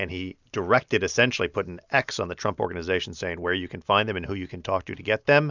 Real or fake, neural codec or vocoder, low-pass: real; none; 7.2 kHz